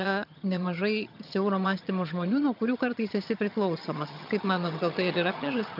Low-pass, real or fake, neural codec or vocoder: 5.4 kHz; fake; vocoder, 22.05 kHz, 80 mel bands, HiFi-GAN